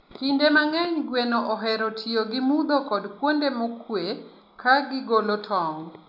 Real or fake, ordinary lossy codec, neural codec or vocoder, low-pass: real; none; none; 5.4 kHz